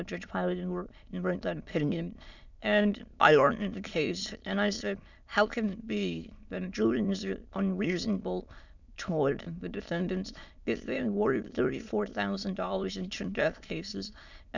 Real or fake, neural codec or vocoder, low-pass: fake; autoencoder, 22.05 kHz, a latent of 192 numbers a frame, VITS, trained on many speakers; 7.2 kHz